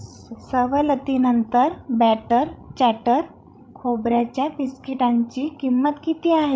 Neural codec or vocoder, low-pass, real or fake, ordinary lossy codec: codec, 16 kHz, 16 kbps, FreqCodec, larger model; none; fake; none